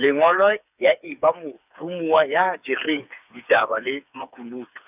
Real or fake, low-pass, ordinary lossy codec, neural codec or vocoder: fake; 3.6 kHz; none; codec, 16 kHz, 4 kbps, FreqCodec, smaller model